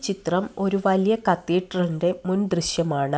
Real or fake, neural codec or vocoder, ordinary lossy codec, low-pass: real; none; none; none